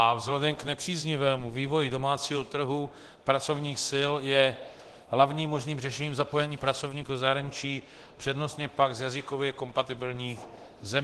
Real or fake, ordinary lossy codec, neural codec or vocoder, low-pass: fake; Opus, 16 kbps; codec, 24 kHz, 0.9 kbps, DualCodec; 10.8 kHz